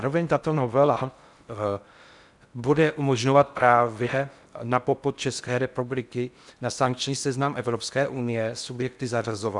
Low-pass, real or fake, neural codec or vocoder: 10.8 kHz; fake; codec, 16 kHz in and 24 kHz out, 0.6 kbps, FocalCodec, streaming, 4096 codes